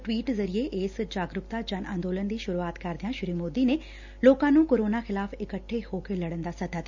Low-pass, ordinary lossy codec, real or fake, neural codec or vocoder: 7.2 kHz; none; real; none